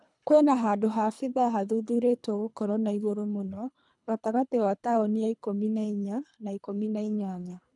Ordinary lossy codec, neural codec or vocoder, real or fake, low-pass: none; codec, 24 kHz, 3 kbps, HILCodec; fake; 10.8 kHz